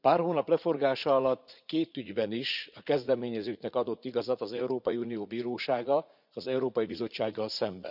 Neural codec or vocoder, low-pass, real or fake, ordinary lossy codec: vocoder, 44.1 kHz, 80 mel bands, Vocos; 5.4 kHz; fake; none